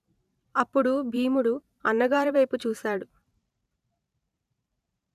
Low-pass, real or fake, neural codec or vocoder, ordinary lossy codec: 14.4 kHz; fake; vocoder, 44.1 kHz, 128 mel bands every 512 samples, BigVGAN v2; none